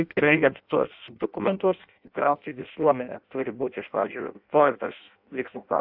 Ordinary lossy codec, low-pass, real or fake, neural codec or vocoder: AAC, 48 kbps; 5.4 kHz; fake; codec, 16 kHz in and 24 kHz out, 0.6 kbps, FireRedTTS-2 codec